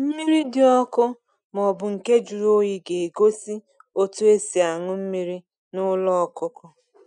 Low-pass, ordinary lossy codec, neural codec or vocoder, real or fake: 9.9 kHz; none; none; real